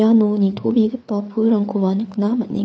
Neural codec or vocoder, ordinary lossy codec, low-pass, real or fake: codec, 16 kHz, 4 kbps, FreqCodec, larger model; none; none; fake